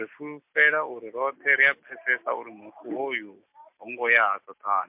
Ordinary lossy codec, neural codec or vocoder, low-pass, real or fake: MP3, 32 kbps; none; 3.6 kHz; real